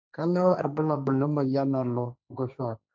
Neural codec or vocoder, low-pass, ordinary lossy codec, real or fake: codec, 16 kHz, 1.1 kbps, Voila-Tokenizer; none; none; fake